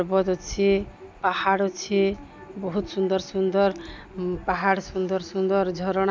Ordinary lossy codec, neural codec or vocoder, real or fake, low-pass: none; none; real; none